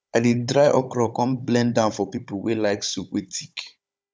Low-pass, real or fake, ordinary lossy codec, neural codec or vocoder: none; fake; none; codec, 16 kHz, 16 kbps, FunCodec, trained on Chinese and English, 50 frames a second